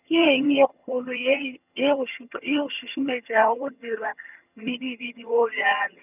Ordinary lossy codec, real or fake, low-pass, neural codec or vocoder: none; fake; 3.6 kHz; vocoder, 22.05 kHz, 80 mel bands, HiFi-GAN